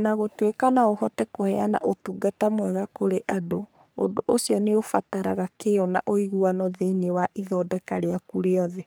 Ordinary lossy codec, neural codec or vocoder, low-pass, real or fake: none; codec, 44.1 kHz, 3.4 kbps, Pupu-Codec; none; fake